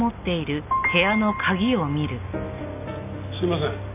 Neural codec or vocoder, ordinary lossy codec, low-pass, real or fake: none; none; 3.6 kHz; real